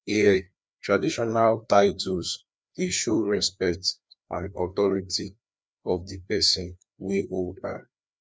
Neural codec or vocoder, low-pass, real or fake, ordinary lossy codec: codec, 16 kHz, 2 kbps, FreqCodec, larger model; none; fake; none